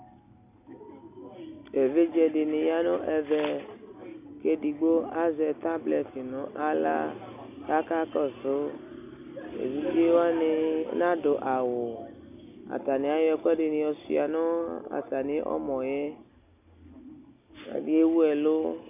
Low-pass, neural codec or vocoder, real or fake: 3.6 kHz; none; real